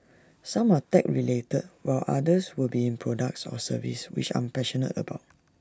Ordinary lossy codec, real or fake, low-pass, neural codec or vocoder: none; real; none; none